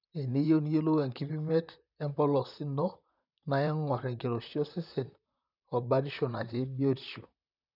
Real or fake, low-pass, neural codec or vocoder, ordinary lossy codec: fake; 5.4 kHz; vocoder, 44.1 kHz, 128 mel bands, Pupu-Vocoder; none